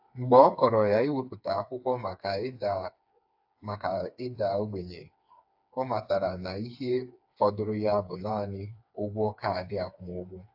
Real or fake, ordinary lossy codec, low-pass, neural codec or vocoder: fake; AAC, 48 kbps; 5.4 kHz; codec, 16 kHz, 4 kbps, FreqCodec, smaller model